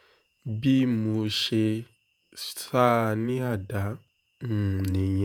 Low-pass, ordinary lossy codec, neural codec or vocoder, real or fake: none; none; vocoder, 48 kHz, 128 mel bands, Vocos; fake